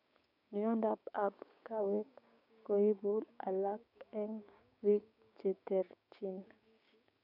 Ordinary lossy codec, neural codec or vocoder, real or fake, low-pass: none; codec, 16 kHz, 6 kbps, DAC; fake; 5.4 kHz